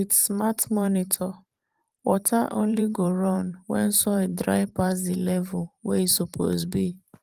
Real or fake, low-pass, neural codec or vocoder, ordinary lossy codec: fake; 14.4 kHz; vocoder, 44.1 kHz, 128 mel bands every 512 samples, BigVGAN v2; Opus, 24 kbps